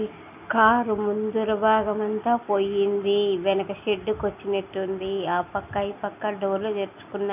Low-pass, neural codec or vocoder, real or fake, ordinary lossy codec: 3.6 kHz; none; real; none